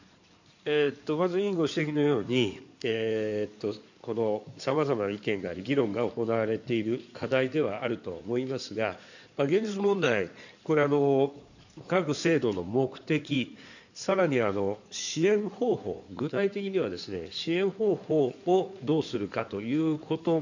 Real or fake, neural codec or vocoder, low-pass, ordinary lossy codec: fake; codec, 16 kHz in and 24 kHz out, 2.2 kbps, FireRedTTS-2 codec; 7.2 kHz; none